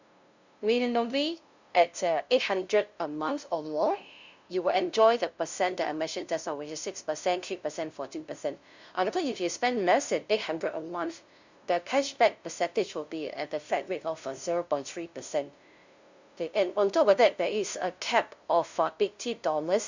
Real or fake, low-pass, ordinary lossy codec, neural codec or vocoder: fake; 7.2 kHz; Opus, 64 kbps; codec, 16 kHz, 0.5 kbps, FunCodec, trained on LibriTTS, 25 frames a second